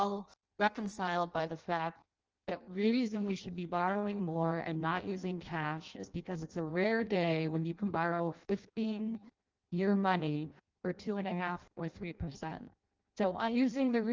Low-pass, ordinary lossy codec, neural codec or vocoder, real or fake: 7.2 kHz; Opus, 24 kbps; codec, 16 kHz in and 24 kHz out, 0.6 kbps, FireRedTTS-2 codec; fake